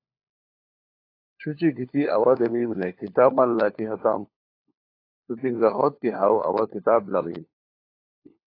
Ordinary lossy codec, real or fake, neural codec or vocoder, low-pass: AAC, 32 kbps; fake; codec, 16 kHz, 4 kbps, FunCodec, trained on LibriTTS, 50 frames a second; 5.4 kHz